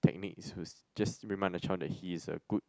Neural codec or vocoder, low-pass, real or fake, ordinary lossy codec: none; none; real; none